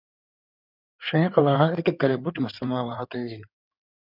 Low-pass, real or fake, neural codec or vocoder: 5.4 kHz; fake; codec, 16 kHz in and 24 kHz out, 2.2 kbps, FireRedTTS-2 codec